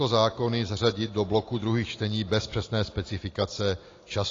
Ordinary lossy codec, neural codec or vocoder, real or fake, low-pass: AAC, 32 kbps; none; real; 7.2 kHz